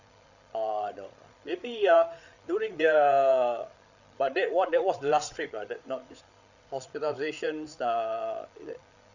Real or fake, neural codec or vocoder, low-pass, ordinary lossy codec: fake; codec, 16 kHz, 8 kbps, FreqCodec, larger model; 7.2 kHz; none